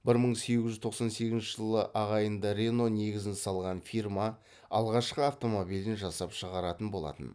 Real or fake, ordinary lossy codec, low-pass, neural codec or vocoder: real; none; none; none